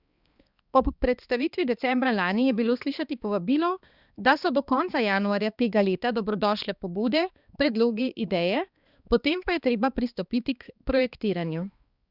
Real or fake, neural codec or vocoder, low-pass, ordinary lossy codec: fake; codec, 16 kHz, 2 kbps, X-Codec, HuBERT features, trained on balanced general audio; 5.4 kHz; Opus, 64 kbps